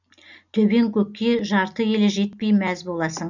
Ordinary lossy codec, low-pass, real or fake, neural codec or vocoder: none; 7.2 kHz; real; none